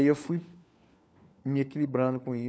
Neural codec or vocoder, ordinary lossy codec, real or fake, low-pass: codec, 16 kHz, 4 kbps, FunCodec, trained on LibriTTS, 50 frames a second; none; fake; none